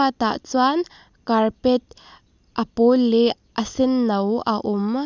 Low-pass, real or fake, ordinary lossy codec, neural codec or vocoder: 7.2 kHz; real; none; none